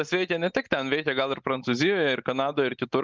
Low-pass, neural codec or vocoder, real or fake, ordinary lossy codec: 7.2 kHz; none; real; Opus, 24 kbps